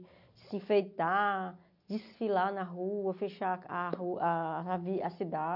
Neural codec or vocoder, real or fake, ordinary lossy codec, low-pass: none; real; none; 5.4 kHz